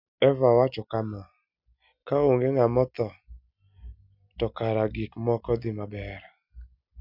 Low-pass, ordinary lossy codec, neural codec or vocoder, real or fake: 5.4 kHz; none; none; real